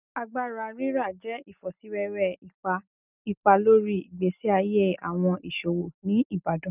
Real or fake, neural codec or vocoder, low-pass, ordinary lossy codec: real; none; 3.6 kHz; none